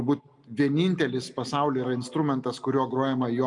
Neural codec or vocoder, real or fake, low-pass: none; real; 10.8 kHz